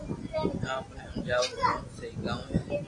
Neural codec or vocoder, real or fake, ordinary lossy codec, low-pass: none; real; AAC, 32 kbps; 10.8 kHz